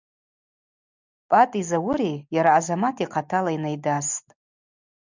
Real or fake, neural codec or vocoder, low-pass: real; none; 7.2 kHz